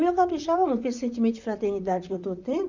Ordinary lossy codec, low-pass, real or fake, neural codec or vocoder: none; 7.2 kHz; fake; vocoder, 44.1 kHz, 128 mel bands, Pupu-Vocoder